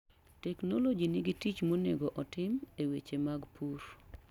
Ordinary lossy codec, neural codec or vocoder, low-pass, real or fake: none; none; 19.8 kHz; real